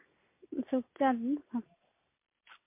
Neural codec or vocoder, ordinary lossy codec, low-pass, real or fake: none; MP3, 24 kbps; 3.6 kHz; real